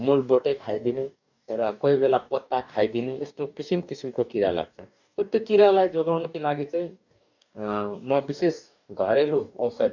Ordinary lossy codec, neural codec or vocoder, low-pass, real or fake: none; codec, 44.1 kHz, 2.6 kbps, DAC; 7.2 kHz; fake